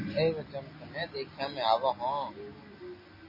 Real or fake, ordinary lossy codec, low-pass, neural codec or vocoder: real; MP3, 24 kbps; 5.4 kHz; none